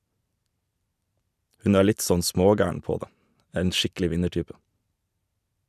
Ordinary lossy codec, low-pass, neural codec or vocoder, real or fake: none; 14.4 kHz; vocoder, 44.1 kHz, 128 mel bands every 512 samples, BigVGAN v2; fake